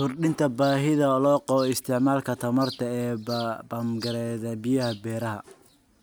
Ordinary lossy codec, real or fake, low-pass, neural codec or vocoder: none; real; none; none